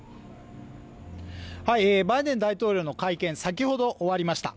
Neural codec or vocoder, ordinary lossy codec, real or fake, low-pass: none; none; real; none